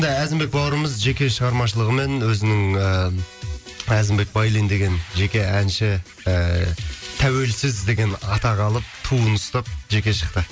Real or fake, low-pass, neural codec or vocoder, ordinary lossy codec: real; none; none; none